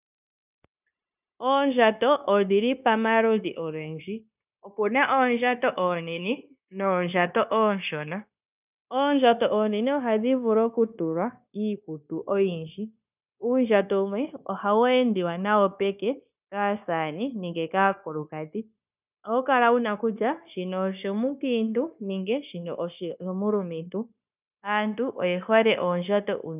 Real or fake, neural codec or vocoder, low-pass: fake; codec, 16 kHz, 0.9 kbps, LongCat-Audio-Codec; 3.6 kHz